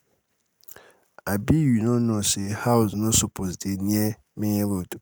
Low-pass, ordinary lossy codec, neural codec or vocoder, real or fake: none; none; none; real